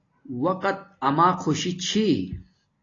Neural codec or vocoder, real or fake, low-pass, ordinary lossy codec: none; real; 7.2 kHz; AAC, 32 kbps